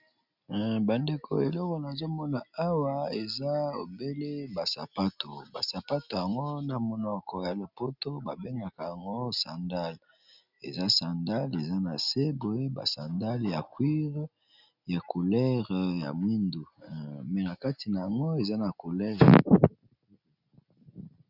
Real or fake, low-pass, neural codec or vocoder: real; 5.4 kHz; none